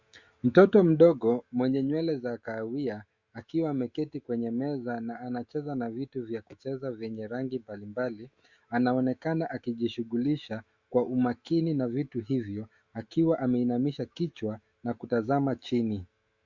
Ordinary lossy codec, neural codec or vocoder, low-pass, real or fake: Opus, 64 kbps; autoencoder, 48 kHz, 128 numbers a frame, DAC-VAE, trained on Japanese speech; 7.2 kHz; fake